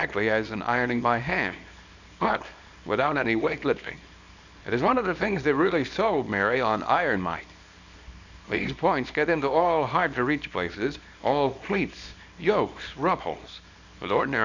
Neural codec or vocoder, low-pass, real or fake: codec, 24 kHz, 0.9 kbps, WavTokenizer, small release; 7.2 kHz; fake